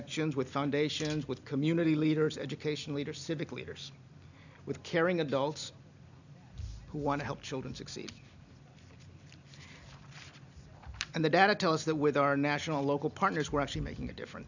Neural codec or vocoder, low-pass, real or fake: none; 7.2 kHz; real